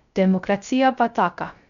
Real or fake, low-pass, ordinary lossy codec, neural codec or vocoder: fake; 7.2 kHz; none; codec, 16 kHz, 0.3 kbps, FocalCodec